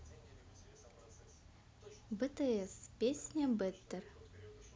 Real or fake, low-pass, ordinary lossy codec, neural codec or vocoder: real; none; none; none